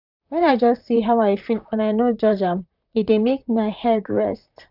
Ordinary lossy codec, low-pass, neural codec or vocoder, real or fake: none; 5.4 kHz; vocoder, 44.1 kHz, 128 mel bands, Pupu-Vocoder; fake